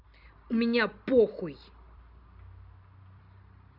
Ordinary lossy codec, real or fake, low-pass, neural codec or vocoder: none; real; 5.4 kHz; none